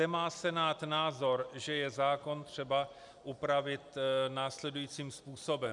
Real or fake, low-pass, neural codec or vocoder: real; 10.8 kHz; none